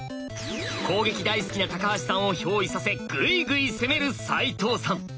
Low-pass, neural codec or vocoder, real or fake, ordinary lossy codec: none; none; real; none